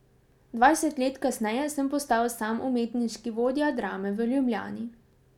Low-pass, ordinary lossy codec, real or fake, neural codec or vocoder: 19.8 kHz; none; real; none